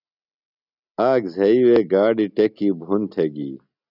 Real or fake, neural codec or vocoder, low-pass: real; none; 5.4 kHz